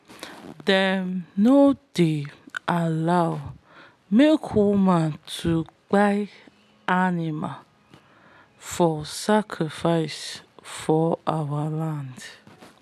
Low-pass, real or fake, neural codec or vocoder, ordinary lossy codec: 14.4 kHz; real; none; none